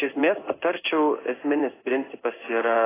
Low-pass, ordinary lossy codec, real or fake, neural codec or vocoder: 3.6 kHz; AAC, 16 kbps; fake; codec, 16 kHz in and 24 kHz out, 1 kbps, XY-Tokenizer